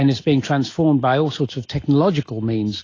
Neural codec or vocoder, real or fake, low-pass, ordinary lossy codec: none; real; 7.2 kHz; AAC, 32 kbps